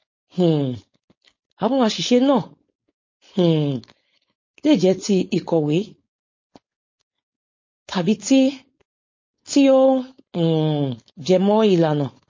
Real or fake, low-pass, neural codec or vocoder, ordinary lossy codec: fake; 7.2 kHz; codec, 16 kHz, 4.8 kbps, FACodec; MP3, 32 kbps